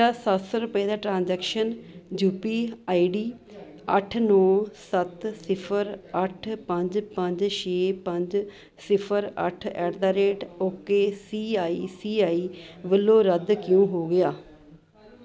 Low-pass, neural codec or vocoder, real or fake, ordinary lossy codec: none; none; real; none